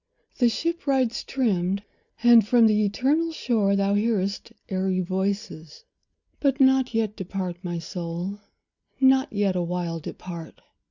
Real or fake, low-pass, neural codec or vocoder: real; 7.2 kHz; none